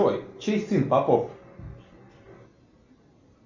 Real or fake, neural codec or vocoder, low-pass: real; none; 7.2 kHz